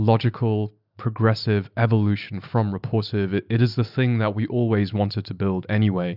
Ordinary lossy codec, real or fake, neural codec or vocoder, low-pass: Opus, 64 kbps; real; none; 5.4 kHz